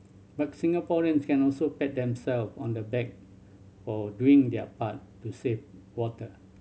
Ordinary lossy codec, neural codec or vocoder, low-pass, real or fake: none; none; none; real